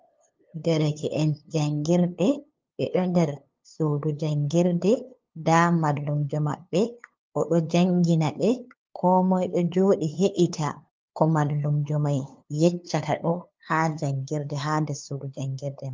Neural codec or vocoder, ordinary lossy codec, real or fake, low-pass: codec, 16 kHz, 2 kbps, FunCodec, trained on LibriTTS, 25 frames a second; Opus, 24 kbps; fake; 7.2 kHz